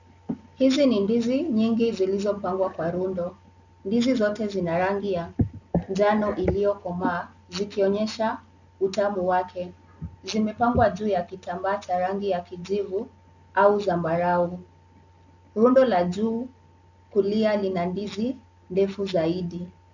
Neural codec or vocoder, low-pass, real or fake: none; 7.2 kHz; real